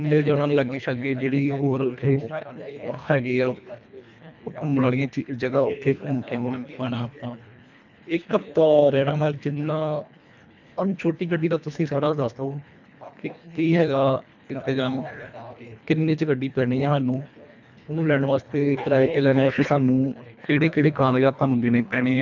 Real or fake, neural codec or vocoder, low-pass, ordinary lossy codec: fake; codec, 24 kHz, 1.5 kbps, HILCodec; 7.2 kHz; none